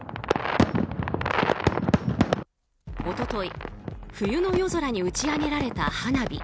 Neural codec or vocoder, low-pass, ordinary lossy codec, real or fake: none; none; none; real